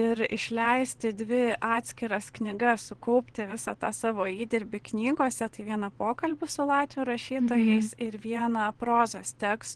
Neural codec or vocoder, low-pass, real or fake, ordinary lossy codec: vocoder, 22.05 kHz, 80 mel bands, WaveNeXt; 9.9 kHz; fake; Opus, 16 kbps